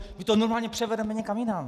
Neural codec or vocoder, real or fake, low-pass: none; real; 14.4 kHz